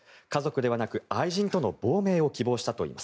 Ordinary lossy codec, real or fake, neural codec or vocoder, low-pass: none; real; none; none